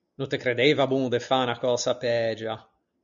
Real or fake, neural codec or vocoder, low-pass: real; none; 7.2 kHz